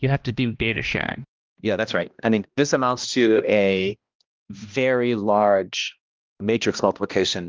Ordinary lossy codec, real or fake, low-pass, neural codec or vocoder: Opus, 32 kbps; fake; 7.2 kHz; codec, 16 kHz, 1 kbps, X-Codec, HuBERT features, trained on balanced general audio